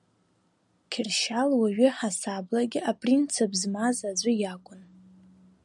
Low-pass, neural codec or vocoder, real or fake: 10.8 kHz; none; real